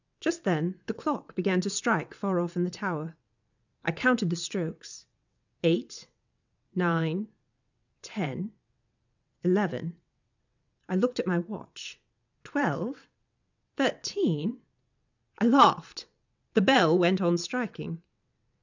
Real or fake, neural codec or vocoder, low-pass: fake; vocoder, 22.05 kHz, 80 mel bands, WaveNeXt; 7.2 kHz